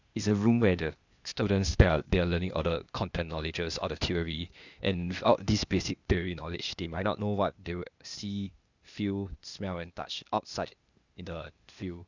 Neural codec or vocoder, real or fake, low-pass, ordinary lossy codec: codec, 16 kHz, 0.8 kbps, ZipCodec; fake; 7.2 kHz; Opus, 64 kbps